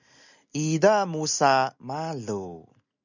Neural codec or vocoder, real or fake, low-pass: none; real; 7.2 kHz